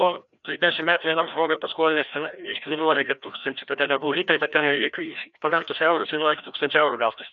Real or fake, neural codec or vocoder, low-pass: fake; codec, 16 kHz, 1 kbps, FreqCodec, larger model; 7.2 kHz